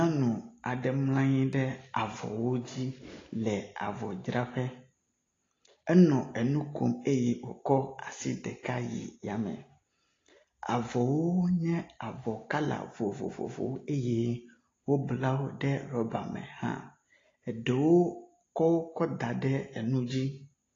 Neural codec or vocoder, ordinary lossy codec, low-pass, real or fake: none; AAC, 32 kbps; 7.2 kHz; real